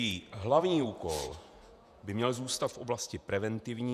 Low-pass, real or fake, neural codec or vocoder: 14.4 kHz; fake; vocoder, 48 kHz, 128 mel bands, Vocos